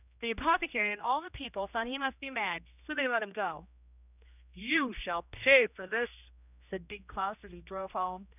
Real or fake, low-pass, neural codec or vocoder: fake; 3.6 kHz; codec, 16 kHz, 1 kbps, X-Codec, HuBERT features, trained on general audio